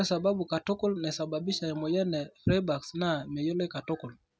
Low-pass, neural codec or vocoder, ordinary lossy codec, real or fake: none; none; none; real